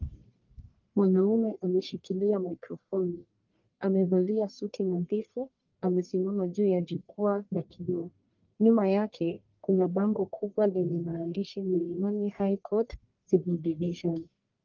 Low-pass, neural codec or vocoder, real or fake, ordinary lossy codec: 7.2 kHz; codec, 44.1 kHz, 1.7 kbps, Pupu-Codec; fake; Opus, 32 kbps